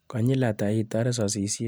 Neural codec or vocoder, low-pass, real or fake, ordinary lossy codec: none; none; real; none